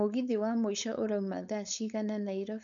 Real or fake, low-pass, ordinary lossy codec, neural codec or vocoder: fake; 7.2 kHz; none; codec, 16 kHz, 4.8 kbps, FACodec